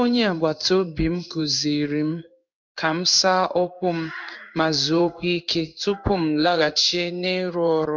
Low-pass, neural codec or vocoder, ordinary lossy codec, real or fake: 7.2 kHz; codec, 16 kHz in and 24 kHz out, 1 kbps, XY-Tokenizer; none; fake